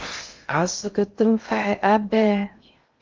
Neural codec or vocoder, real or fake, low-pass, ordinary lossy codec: codec, 16 kHz in and 24 kHz out, 0.6 kbps, FocalCodec, streaming, 2048 codes; fake; 7.2 kHz; Opus, 32 kbps